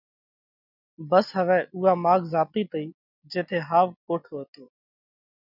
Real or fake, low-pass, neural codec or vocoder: real; 5.4 kHz; none